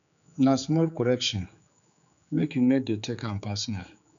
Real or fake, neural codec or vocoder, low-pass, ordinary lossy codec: fake; codec, 16 kHz, 4 kbps, X-Codec, HuBERT features, trained on general audio; 7.2 kHz; none